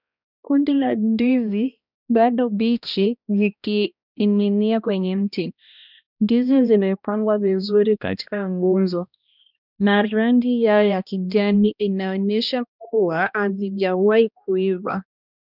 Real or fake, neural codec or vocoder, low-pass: fake; codec, 16 kHz, 1 kbps, X-Codec, HuBERT features, trained on balanced general audio; 5.4 kHz